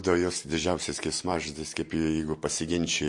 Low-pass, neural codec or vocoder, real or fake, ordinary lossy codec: 10.8 kHz; none; real; MP3, 48 kbps